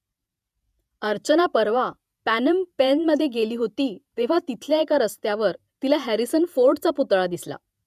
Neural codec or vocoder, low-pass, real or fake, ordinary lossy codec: vocoder, 48 kHz, 128 mel bands, Vocos; 14.4 kHz; fake; none